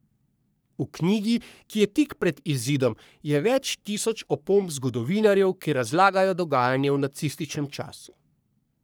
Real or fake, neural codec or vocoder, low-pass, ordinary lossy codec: fake; codec, 44.1 kHz, 3.4 kbps, Pupu-Codec; none; none